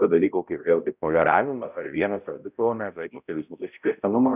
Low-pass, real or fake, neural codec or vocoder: 3.6 kHz; fake; codec, 16 kHz, 0.5 kbps, X-Codec, HuBERT features, trained on balanced general audio